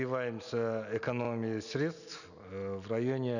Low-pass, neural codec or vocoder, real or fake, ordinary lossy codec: 7.2 kHz; none; real; none